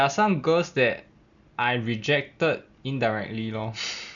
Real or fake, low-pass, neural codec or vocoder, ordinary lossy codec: real; 7.2 kHz; none; none